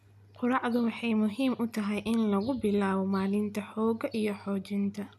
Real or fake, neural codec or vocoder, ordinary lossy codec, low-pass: real; none; none; 14.4 kHz